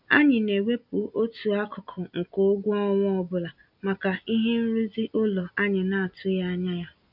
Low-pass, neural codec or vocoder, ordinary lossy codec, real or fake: 5.4 kHz; none; none; real